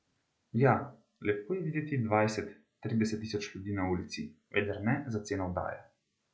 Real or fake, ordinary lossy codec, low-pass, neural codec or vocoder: real; none; none; none